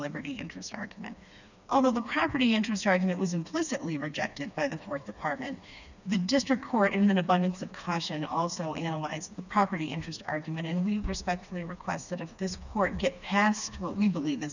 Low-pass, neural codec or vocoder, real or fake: 7.2 kHz; codec, 16 kHz, 2 kbps, FreqCodec, smaller model; fake